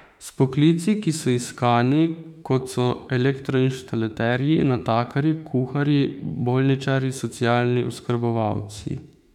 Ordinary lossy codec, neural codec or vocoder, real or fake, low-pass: none; autoencoder, 48 kHz, 32 numbers a frame, DAC-VAE, trained on Japanese speech; fake; 19.8 kHz